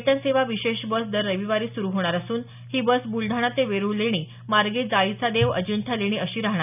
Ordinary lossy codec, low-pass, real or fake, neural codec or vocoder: none; 3.6 kHz; real; none